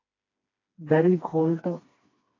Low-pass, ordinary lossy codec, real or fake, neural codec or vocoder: 7.2 kHz; AAC, 32 kbps; fake; codec, 16 kHz, 2 kbps, FreqCodec, smaller model